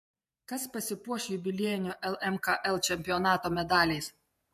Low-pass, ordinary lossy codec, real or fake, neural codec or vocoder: 14.4 kHz; MP3, 64 kbps; fake; vocoder, 48 kHz, 128 mel bands, Vocos